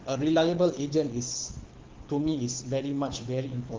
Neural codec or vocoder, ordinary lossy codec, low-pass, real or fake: codec, 24 kHz, 6 kbps, HILCodec; Opus, 16 kbps; 7.2 kHz; fake